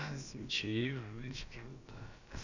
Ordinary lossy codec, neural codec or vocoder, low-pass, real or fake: none; codec, 16 kHz, about 1 kbps, DyCAST, with the encoder's durations; 7.2 kHz; fake